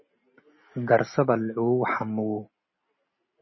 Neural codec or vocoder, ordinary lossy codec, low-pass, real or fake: none; MP3, 24 kbps; 7.2 kHz; real